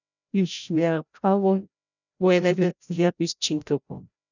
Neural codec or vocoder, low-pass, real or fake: codec, 16 kHz, 0.5 kbps, FreqCodec, larger model; 7.2 kHz; fake